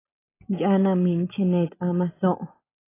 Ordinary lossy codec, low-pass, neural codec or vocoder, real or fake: AAC, 16 kbps; 3.6 kHz; none; real